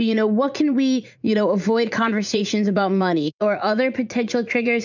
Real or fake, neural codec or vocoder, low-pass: fake; vocoder, 44.1 kHz, 80 mel bands, Vocos; 7.2 kHz